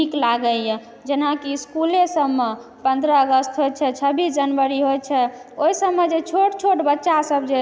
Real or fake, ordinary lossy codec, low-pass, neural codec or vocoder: real; none; none; none